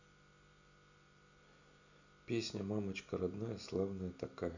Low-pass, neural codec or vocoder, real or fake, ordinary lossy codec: 7.2 kHz; none; real; none